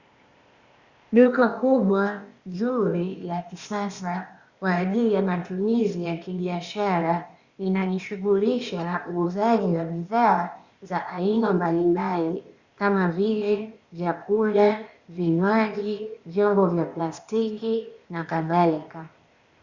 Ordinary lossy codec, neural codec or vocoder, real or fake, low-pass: Opus, 64 kbps; codec, 16 kHz, 0.8 kbps, ZipCodec; fake; 7.2 kHz